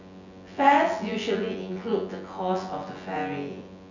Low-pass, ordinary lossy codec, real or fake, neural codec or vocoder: 7.2 kHz; none; fake; vocoder, 24 kHz, 100 mel bands, Vocos